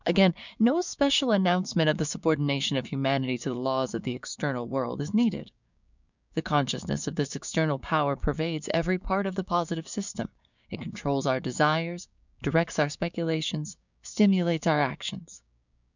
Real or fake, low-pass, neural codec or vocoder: fake; 7.2 kHz; codec, 16 kHz, 6 kbps, DAC